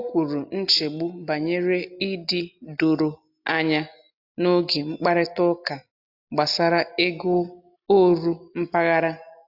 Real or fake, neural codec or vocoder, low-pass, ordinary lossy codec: real; none; 5.4 kHz; AAC, 48 kbps